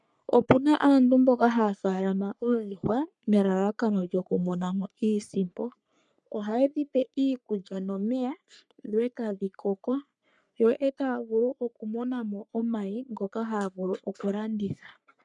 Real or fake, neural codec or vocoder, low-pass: fake; codec, 44.1 kHz, 3.4 kbps, Pupu-Codec; 10.8 kHz